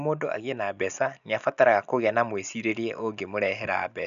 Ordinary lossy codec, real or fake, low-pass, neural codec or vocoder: none; real; 7.2 kHz; none